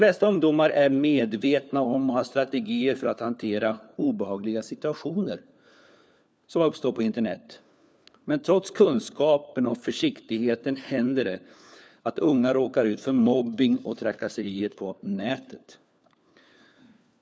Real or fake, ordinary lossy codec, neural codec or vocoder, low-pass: fake; none; codec, 16 kHz, 4 kbps, FunCodec, trained on LibriTTS, 50 frames a second; none